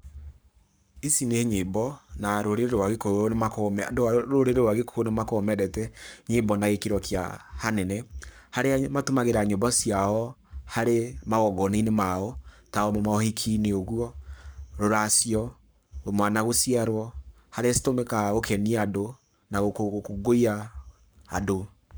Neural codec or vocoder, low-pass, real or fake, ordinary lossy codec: codec, 44.1 kHz, 7.8 kbps, Pupu-Codec; none; fake; none